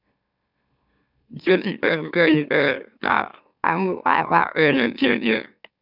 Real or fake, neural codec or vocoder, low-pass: fake; autoencoder, 44.1 kHz, a latent of 192 numbers a frame, MeloTTS; 5.4 kHz